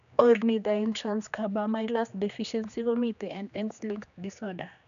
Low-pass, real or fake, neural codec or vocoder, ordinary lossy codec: 7.2 kHz; fake; codec, 16 kHz, 2 kbps, X-Codec, HuBERT features, trained on general audio; none